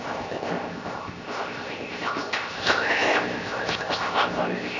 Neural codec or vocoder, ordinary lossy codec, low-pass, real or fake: codec, 16 kHz, 0.7 kbps, FocalCodec; none; 7.2 kHz; fake